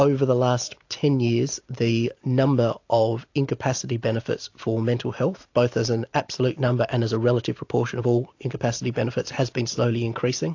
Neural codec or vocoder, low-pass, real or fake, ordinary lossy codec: none; 7.2 kHz; real; AAC, 48 kbps